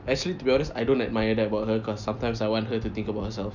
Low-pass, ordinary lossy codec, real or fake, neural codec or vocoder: 7.2 kHz; none; real; none